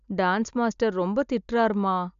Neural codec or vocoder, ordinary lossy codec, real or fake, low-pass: none; none; real; 7.2 kHz